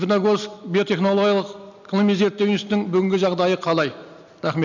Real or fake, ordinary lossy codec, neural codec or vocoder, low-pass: real; none; none; 7.2 kHz